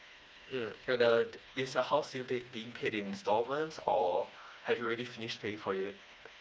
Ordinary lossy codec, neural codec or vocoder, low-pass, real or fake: none; codec, 16 kHz, 2 kbps, FreqCodec, smaller model; none; fake